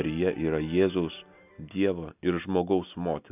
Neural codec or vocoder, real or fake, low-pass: none; real; 3.6 kHz